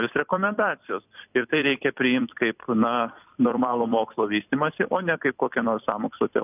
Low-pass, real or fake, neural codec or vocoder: 3.6 kHz; fake; vocoder, 44.1 kHz, 128 mel bands every 256 samples, BigVGAN v2